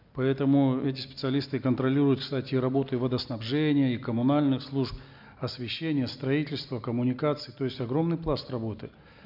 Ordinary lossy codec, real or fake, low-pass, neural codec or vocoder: MP3, 48 kbps; real; 5.4 kHz; none